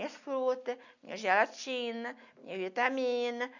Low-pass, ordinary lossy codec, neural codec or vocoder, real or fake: 7.2 kHz; none; none; real